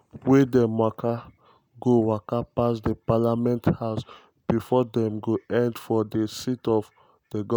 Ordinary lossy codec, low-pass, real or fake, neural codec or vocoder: none; none; real; none